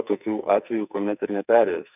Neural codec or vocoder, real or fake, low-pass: codec, 44.1 kHz, 2.6 kbps, SNAC; fake; 3.6 kHz